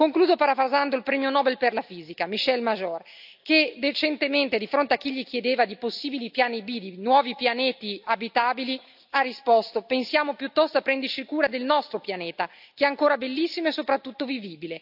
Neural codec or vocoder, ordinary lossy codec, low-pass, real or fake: none; AAC, 48 kbps; 5.4 kHz; real